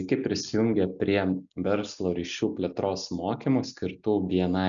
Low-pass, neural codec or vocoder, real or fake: 7.2 kHz; none; real